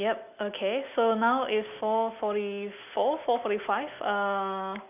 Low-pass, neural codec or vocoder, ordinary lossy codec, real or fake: 3.6 kHz; none; none; real